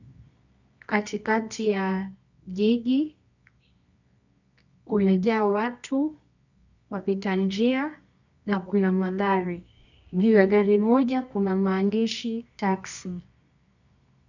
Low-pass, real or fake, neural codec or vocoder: 7.2 kHz; fake; codec, 24 kHz, 0.9 kbps, WavTokenizer, medium music audio release